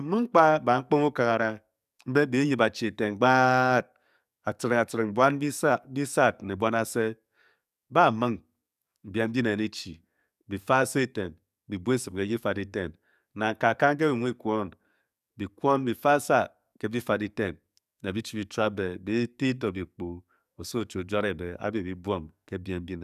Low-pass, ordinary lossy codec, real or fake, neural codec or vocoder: 14.4 kHz; none; fake; codec, 44.1 kHz, 7.8 kbps, DAC